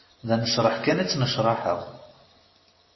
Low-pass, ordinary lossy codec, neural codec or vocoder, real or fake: 7.2 kHz; MP3, 24 kbps; none; real